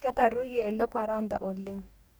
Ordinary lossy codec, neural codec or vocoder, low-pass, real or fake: none; codec, 44.1 kHz, 2.6 kbps, DAC; none; fake